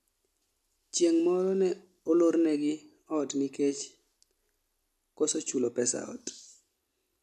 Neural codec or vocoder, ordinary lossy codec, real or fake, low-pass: none; AAC, 96 kbps; real; 14.4 kHz